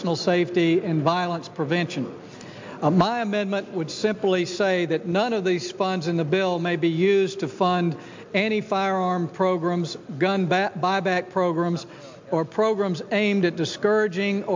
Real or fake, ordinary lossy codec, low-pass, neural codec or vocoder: real; MP3, 64 kbps; 7.2 kHz; none